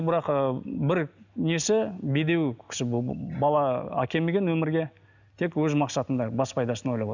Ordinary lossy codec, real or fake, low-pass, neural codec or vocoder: none; real; 7.2 kHz; none